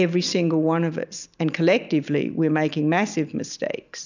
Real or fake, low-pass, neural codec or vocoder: real; 7.2 kHz; none